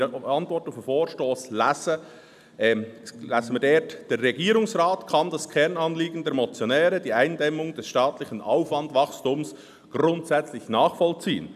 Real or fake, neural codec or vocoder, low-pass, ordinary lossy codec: real; none; 14.4 kHz; none